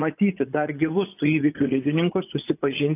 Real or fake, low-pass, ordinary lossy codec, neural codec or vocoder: fake; 3.6 kHz; AAC, 24 kbps; vocoder, 44.1 kHz, 80 mel bands, Vocos